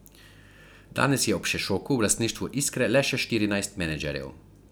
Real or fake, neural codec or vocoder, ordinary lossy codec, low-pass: real; none; none; none